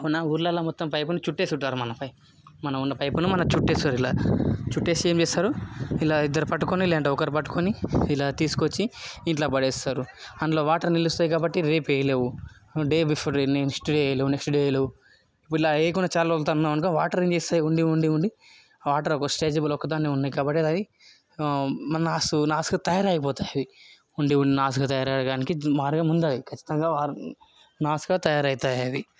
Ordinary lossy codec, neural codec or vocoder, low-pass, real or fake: none; none; none; real